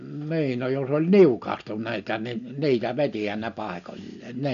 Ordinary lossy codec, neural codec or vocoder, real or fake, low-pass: none; none; real; 7.2 kHz